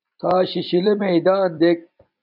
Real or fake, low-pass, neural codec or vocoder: fake; 5.4 kHz; vocoder, 44.1 kHz, 128 mel bands every 256 samples, BigVGAN v2